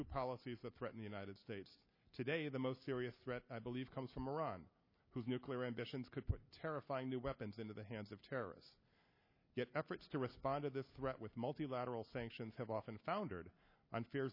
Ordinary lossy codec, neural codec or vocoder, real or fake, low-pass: MP3, 24 kbps; none; real; 5.4 kHz